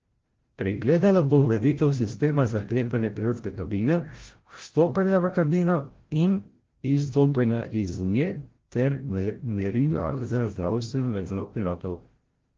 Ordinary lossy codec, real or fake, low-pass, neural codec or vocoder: Opus, 16 kbps; fake; 7.2 kHz; codec, 16 kHz, 0.5 kbps, FreqCodec, larger model